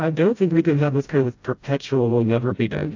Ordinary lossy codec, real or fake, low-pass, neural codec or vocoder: AAC, 48 kbps; fake; 7.2 kHz; codec, 16 kHz, 0.5 kbps, FreqCodec, smaller model